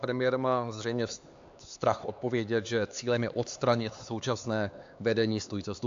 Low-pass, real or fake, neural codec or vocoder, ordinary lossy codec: 7.2 kHz; fake; codec, 16 kHz, 4 kbps, X-Codec, HuBERT features, trained on LibriSpeech; AAC, 64 kbps